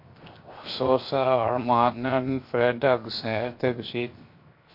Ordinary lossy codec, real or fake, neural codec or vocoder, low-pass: MP3, 32 kbps; fake; codec, 16 kHz, 0.7 kbps, FocalCodec; 5.4 kHz